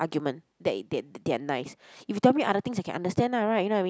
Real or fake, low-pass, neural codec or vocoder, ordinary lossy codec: real; none; none; none